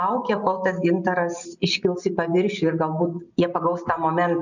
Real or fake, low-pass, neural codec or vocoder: real; 7.2 kHz; none